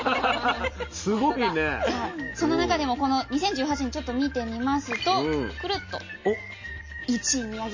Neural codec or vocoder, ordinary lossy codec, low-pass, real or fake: none; MP3, 32 kbps; 7.2 kHz; real